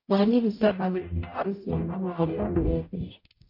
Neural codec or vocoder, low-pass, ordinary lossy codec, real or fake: codec, 44.1 kHz, 0.9 kbps, DAC; 5.4 kHz; MP3, 32 kbps; fake